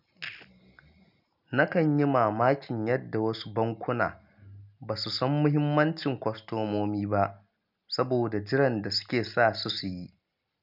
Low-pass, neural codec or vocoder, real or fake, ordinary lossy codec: 5.4 kHz; none; real; none